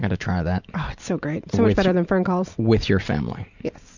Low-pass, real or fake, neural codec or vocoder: 7.2 kHz; real; none